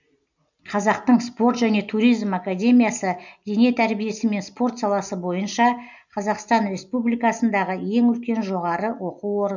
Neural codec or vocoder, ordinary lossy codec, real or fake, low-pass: none; none; real; 7.2 kHz